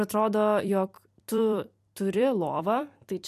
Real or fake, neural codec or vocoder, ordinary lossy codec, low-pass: fake; vocoder, 44.1 kHz, 128 mel bands every 256 samples, BigVGAN v2; MP3, 96 kbps; 14.4 kHz